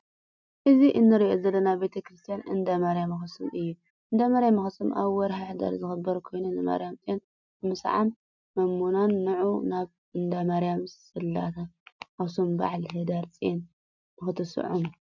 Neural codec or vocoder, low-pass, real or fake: none; 7.2 kHz; real